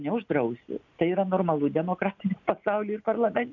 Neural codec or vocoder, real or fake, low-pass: none; real; 7.2 kHz